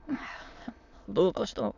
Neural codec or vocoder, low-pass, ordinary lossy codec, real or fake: autoencoder, 22.05 kHz, a latent of 192 numbers a frame, VITS, trained on many speakers; 7.2 kHz; none; fake